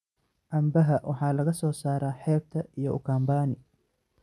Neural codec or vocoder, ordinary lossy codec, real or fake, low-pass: none; none; real; none